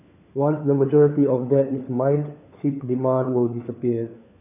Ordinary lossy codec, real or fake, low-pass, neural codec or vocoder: none; fake; 3.6 kHz; codec, 16 kHz, 4 kbps, FunCodec, trained on LibriTTS, 50 frames a second